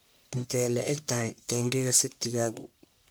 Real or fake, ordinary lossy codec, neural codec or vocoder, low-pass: fake; none; codec, 44.1 kHz, 1.7 kbps, Pupu-Codec; none